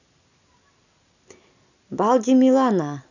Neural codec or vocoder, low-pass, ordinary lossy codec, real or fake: none; 7.2 kHz; none; real